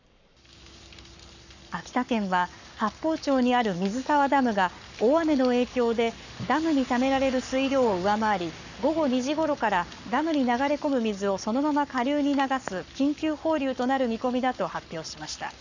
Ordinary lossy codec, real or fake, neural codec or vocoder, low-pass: none; fake; codec, 44.1 kHz, 7.8 kbps, Pupu-Codec; 7.2 kHz